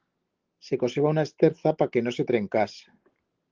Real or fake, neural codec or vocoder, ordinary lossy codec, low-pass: real; none; Opus, 16 kbps; 7.2 kHz